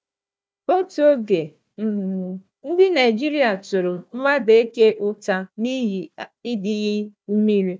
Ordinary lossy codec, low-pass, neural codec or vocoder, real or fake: none; none; codec, 16 kHz, 1 kbps, FunCodec, trained on Chinese and English, 50 frames a second; fake